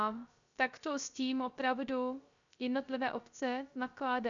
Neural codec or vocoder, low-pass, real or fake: codec, 16 kHz, 0.3 kbps, FocalCodec; 7.2 kHz; fake